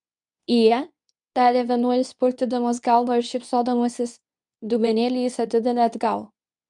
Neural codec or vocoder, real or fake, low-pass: codec, 24 kHz, 0.9 kbps, WavTokenizer, medium speech release version 2; fake; 10.8 kHz